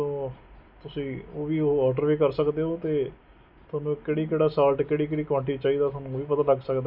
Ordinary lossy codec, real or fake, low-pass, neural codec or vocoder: none; real; 5.4 kHz; none